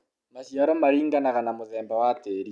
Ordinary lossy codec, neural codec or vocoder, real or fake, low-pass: none; none; real; none